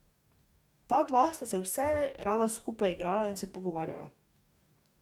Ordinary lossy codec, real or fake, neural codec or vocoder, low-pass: MP3, 96 kbps; fake; codec, 44.1 kHz, 2.6 kbps, DAC; 19.8 kHz